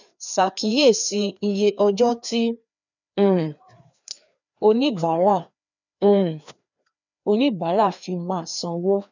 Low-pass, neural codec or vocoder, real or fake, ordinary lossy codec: 7.2 kHz; codec, 16 kHz, 2 kbps, FreqCodec, larger model; fake; none